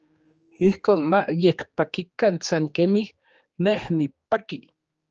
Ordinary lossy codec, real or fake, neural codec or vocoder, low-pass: Opus, 24 kbps; fake; codec, 16 kHz, 2 kbps, X-Codec, HuBERT features, trained on general audio; 7.2 kHz